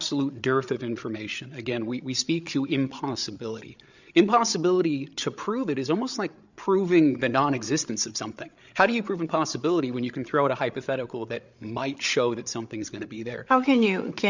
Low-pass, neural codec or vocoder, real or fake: 7.2 kHz; codec, 16 kHz, 8 kbps, FreqCodec, larger model; fake